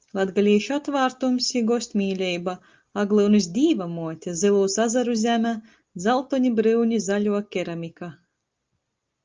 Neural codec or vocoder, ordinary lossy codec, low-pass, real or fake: none; Opus, 24 kbps; 7.2 kHz; real